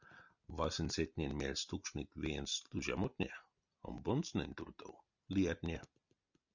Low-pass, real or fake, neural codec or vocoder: 7.2 kHz; real; none